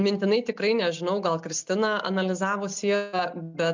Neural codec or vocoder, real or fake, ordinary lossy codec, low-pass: none; real; MP3, 64 kbps; 7.2 kHz